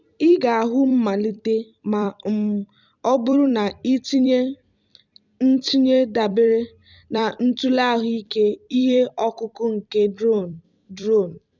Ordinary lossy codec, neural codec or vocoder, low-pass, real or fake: none; vocoder, 44.1 kHz, 128 mel bands every 256 samples, BigVGAN v2; 7.2 kHz; fake